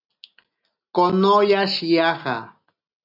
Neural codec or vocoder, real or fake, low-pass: none; real; 5.4 kHz